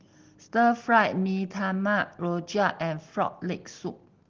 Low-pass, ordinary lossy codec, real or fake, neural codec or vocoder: 7.2 kHz; Opus, 16 kbps; real; none